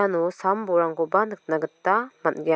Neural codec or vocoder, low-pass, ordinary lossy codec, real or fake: none; none; none; real